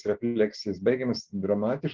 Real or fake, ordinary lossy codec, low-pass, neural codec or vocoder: real; Opus, 16 kbps; 7.2 kHz; none